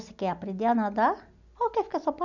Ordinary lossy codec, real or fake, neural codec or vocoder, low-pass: none; real; none; 7.2 kHz